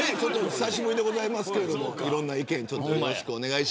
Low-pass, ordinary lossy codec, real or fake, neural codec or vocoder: none; none; real; none